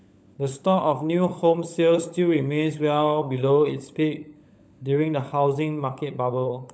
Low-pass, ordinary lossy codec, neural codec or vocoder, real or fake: none; none; codec, 16 kHz, 16 kbps, FunCodec, trained on LibriTTS, 50 frames a second; fake